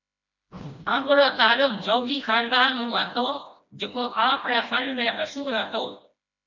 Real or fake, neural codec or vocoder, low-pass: fake; codec, 16 kHz, 1 kbps, FreqCodec, smaller model; 7.2 kHz